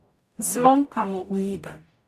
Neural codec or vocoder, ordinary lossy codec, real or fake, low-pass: codec, 44.1 kHz, 0.9 kbps, DAC; none; fake; 14.4 kHz